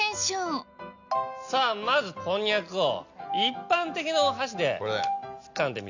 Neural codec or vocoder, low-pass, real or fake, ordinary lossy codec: none; 7.2 kHz; real; none